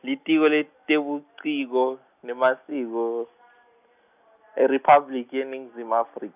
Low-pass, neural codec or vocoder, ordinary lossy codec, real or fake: 3.6 kHz; none; none; real